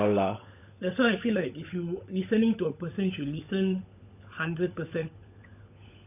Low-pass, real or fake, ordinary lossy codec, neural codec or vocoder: 3.6 kHz; fake; none; codec, 16 kHz, 16 kbps, FunCodec, trained on LibriTTS, 50 frames a second